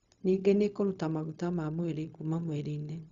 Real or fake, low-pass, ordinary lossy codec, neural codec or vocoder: fake; 7.2 kHz; Opus, 64 kbps; codec, 16 kHz, 0.4 kbps, LongCat-Audio-Codec